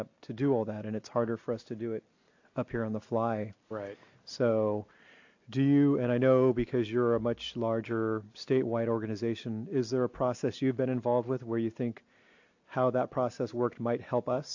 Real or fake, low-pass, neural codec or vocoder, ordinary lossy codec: real; 7.2 kHz; none; AAC, 48 kbps